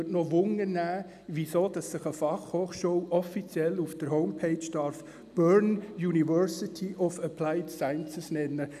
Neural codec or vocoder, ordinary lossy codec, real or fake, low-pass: none; none; real; 14.4 kHz